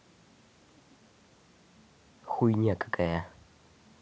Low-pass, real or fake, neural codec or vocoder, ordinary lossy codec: none; real; none; none